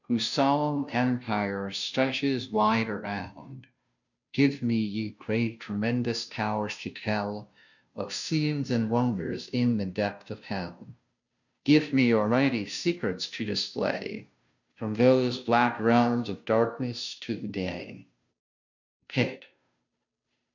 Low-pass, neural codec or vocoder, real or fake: 7.2 kHz; codec, 16 kHz, 0.5 kbps, FunCodec, trained on Chinese and English, 25 frames a second; fake